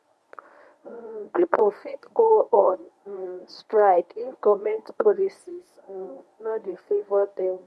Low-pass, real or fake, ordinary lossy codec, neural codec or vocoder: none; fake; none; codec, 24 kHz, 0.9 kbps, WavTokenizer, medium speech release version 1